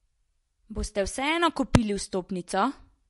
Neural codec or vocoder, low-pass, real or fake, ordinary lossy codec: none; 14.4 kHz; real; MP3, 48 kbps